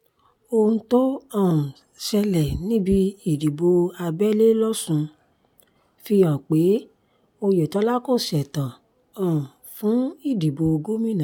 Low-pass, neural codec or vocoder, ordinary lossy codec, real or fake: 19.8 kHz; none; none; real